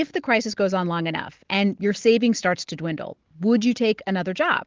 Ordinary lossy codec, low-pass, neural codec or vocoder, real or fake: Opus, 16 kbps; 7.2 kHz; none; real